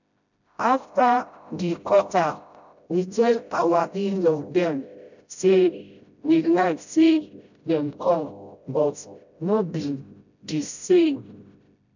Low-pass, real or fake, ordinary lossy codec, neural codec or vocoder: 7.2 kHz; fake; none; codec, 16 kHz, 0.5 kbps, FreqCodec, smaller model